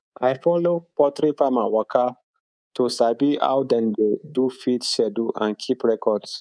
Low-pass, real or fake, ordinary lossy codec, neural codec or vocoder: 9.9 kHz; fake; none; codec, 24 kHz, 3.1 kbps, DualCodec